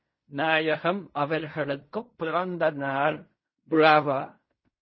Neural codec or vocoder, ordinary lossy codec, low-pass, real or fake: codec, 16 kHz in and 24 kHz out, 0.4 kbps, LongCat-Audio-Codec, fine tuned four codebook decoder; MP3, 24 kbps; 7.2 kHz; fake